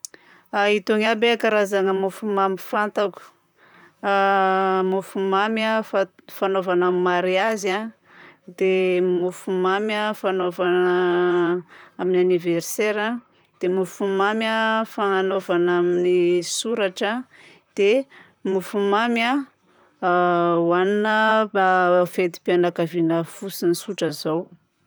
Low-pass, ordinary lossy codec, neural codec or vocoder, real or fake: none; none; vocoder, 44.1 kHz, 128 mel bands, Pupu-Vocoder; fake